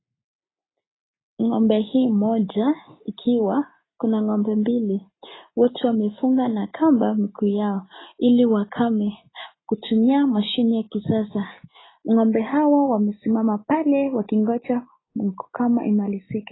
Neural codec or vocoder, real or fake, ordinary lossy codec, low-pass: none; real; AAC, 16 kbps; 7.2 kHz